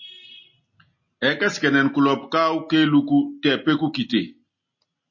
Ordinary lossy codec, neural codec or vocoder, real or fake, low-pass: MP3, 32 kbps; none; real; 7.2 kHz